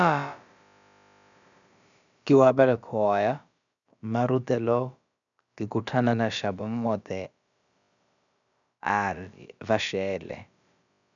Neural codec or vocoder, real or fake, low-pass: codec, 16 kHz, about 1 kbps, DyCAST, with the encoder's durations; fake; 7.2 kHz